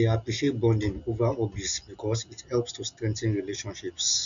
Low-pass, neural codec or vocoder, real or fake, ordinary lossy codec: 7.2 kHz; none; real; none